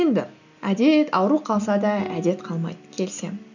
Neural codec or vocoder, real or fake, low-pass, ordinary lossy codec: none; real; 7.2 kHz; none